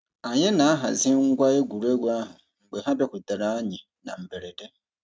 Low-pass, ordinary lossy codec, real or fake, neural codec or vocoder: none; none; real; none